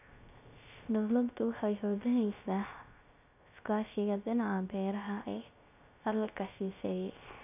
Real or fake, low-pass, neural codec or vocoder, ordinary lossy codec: fake; 3.6 kHz; codec, 16 kHz, 0.3 kbps, FocalCodec; none